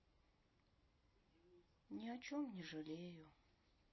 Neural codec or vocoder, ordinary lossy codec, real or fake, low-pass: none; MP3, 24 kbps; real; 7.2 kHz